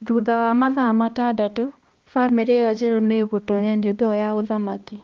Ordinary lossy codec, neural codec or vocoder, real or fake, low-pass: Opus, 24 kbps; codec, 16 kHz, 1 kbps, X-Codec, HuBERT features, trained on balanced general audio; fake; 7.2 kHz